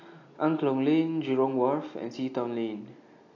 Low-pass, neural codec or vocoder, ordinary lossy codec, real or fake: 7.2 kHz; none; AAC, 48 kbps; real